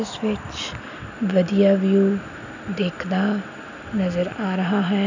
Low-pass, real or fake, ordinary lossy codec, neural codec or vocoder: 7.2 kHz; real; none; none